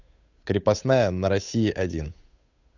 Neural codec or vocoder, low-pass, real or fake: codec, 16 kHz, 8 kbps, FunCodec, trained on Chinese and English, 25 frames a second; 7.2 kHz; fake